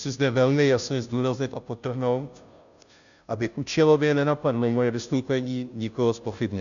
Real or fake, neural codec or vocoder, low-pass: fake; codec, 16 kHz, 0.5 kbps, FunCodec, trained on Chinese and English, 25 frames a second; 7.2 kHz